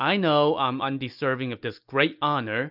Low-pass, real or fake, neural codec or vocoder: 5.4 kHz; real; none